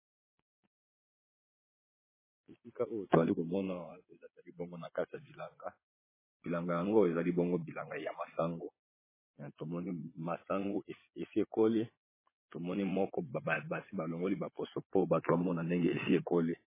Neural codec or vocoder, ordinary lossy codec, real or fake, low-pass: vocoder, 22.05 kHz, 80 mel bands, Vocos; MP3, 16 kbps; fake; 3.6 kHz